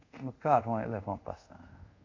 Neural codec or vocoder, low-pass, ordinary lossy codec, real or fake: codec, 24 kHz, 0.5 kbps, DualCodec; 7.2 kHz; MP3, 48 kbps; fake